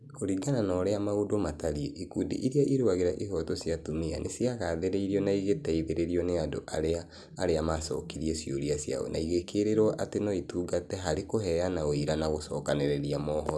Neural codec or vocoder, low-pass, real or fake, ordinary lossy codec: none; none; real; none